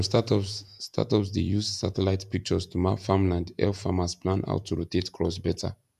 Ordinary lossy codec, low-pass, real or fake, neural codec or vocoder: none; 14.4 kHz; fake; vocoder, 48 kHz, 128 mel bands, Vocos